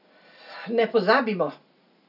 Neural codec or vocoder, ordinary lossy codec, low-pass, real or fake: none; none; 5.4 kHz; real